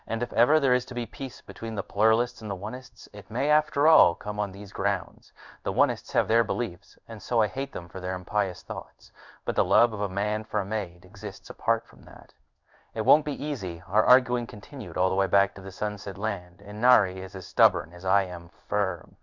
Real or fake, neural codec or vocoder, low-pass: fake; codec, 16 kHz in and 24 kHz out, 1 kbps, XY-Tokenizer; 7.2 kHz